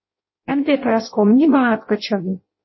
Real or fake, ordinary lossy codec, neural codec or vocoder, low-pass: fake; MP3, 24 kbps; codec, 16 kHz in and 24 kHz out, 0.6 kbps, FireRedTTS-2 codec; 7.2 kHz